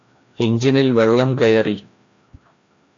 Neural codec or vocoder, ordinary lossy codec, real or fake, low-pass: codec, 16 kHz, 1 kbps, FreqCodec, larger model; AAC, 48 kbps; fake; 7.2 kHz